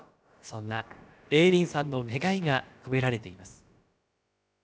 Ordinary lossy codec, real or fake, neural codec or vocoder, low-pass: none; fake; codec, 16 kHz, about 1 kbps, DyCAST, with the encoder's durations; none